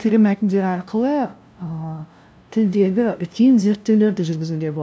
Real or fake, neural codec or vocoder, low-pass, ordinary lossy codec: fake; codec, 16 kHz, 0.5 kbps, FunCodec, trained on LibriTTS, 25 frames a second; none; none